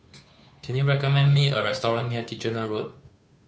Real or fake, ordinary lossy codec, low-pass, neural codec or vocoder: fake; none; none; codec, 16 kHz, 2 kbps, FunCodec, trained on Chinese and English, 25 frames a second